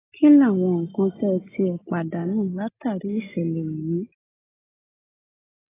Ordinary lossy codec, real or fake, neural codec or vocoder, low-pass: AAC, 16 kbps; real; none; 3.6 kHz